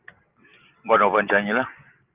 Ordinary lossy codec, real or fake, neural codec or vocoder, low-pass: Opus, 24 kbps; real; none; 3.6 kHz